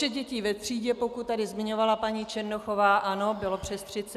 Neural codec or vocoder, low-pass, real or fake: none; 14.4 kHz; real